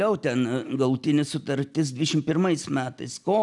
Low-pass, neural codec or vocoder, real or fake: 10.8 kHz; none; real